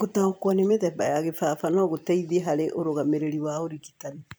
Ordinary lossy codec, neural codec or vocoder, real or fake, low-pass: none; vocoder, 44.1 kHz, 128 mel bands every 256 samples, BigVGAN v2; fake; none